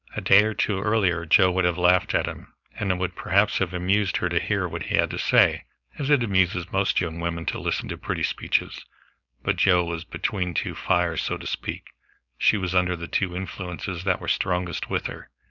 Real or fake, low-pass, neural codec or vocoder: fake; 7.2 kHz; codec, 16 kHz, 4.8 kbps, FACodec